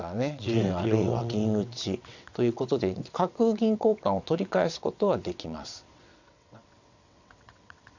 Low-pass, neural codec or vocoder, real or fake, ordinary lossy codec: 7.2 kHz; vocoder, 22.05 kHz, 80 mel bands, WaveNeXt; fake; none